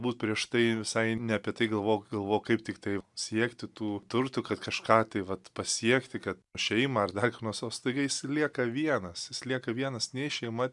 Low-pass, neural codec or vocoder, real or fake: 10.8 kHz; none; real